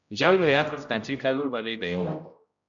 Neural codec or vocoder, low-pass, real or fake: codec, 16 kHz, 0.5 kbps, X-Codec, HuBERT features, trained on general audio; 7.2 kHz; fake